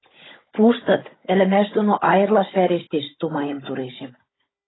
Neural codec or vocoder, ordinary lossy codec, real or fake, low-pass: codec, 16 kHz, 16 kbps, FunCodec, trained on Chinese and English, 50 frames a second; AAC, 16 kbps; fake; 7.2 kHz